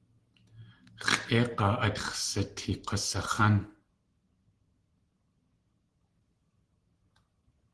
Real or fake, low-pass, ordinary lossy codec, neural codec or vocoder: real; 10.8 kHz; Opus, 16 kbps; none